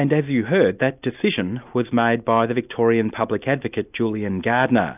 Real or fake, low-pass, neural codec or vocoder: real; 3.6 kHz; none